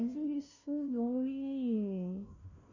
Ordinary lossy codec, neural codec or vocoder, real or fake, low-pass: none; codec, 16 kHz, 0.5 kbps, FunCodec, trained on Chinese and English, 25 frames a second; fake; 7.2 kHz